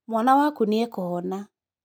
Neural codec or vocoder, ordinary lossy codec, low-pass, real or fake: none; none; none; real